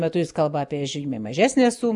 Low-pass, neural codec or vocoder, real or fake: 10.8 kHz; none; real